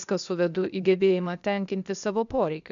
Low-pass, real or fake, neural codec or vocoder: 7.2 kHz; fake; codec, 16 kHz, 0.8 kbps, ZipCodec